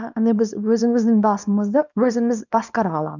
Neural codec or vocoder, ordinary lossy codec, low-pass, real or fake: codec, 24 kHz, 0.9 kbps, WavTokenizer, small release; none; 7.2 kHz; fake